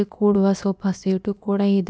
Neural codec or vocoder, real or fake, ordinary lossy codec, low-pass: codec, 16 kHz, about 1 kbps, DyCAST, with the encoder's durations; fake; none; none